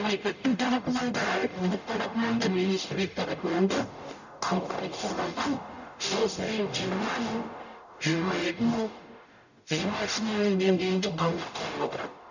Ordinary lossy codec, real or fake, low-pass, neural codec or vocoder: none; fake; 7.2 kHz; codec, 44.1 kHz, 0.9 kbps, DAC